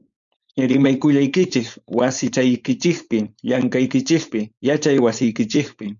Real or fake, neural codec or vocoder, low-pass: fake; codec, 16 kHz, 4.8 kbps, FACodec; 7.2 kHz